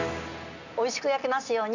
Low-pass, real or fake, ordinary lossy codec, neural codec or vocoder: 7.2 kHz; real; none; none